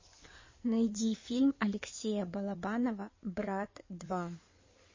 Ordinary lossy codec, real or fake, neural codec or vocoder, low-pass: MP3, 32 kbps; fake; codec, 16 kHz in and 24 kHz out, 2.2 kbps, FireRedTTS-2 codec; 7.2 kHz